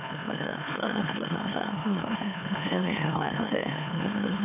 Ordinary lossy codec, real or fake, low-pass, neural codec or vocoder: none; fake; 3.6 kHz; autoencoder, 44.1 kHz, a latent of 192 numbers a frame, MeloTTS